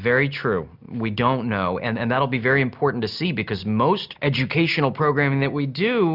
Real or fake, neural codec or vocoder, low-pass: real; none; 5.4 kHz